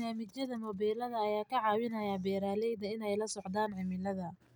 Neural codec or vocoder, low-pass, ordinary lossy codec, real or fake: none; none; none; real